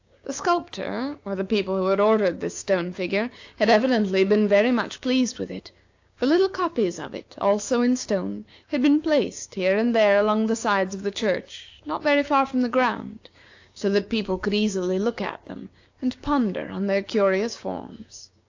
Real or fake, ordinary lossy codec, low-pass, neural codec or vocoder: fake; AAC, 48 kbps; 7.2 kHz; codec, 16 kHz, 6 kbps, DAC